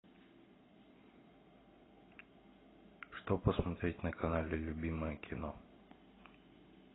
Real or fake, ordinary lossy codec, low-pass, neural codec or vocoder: real; AAC, 16 kbps; 7.2 kHz; none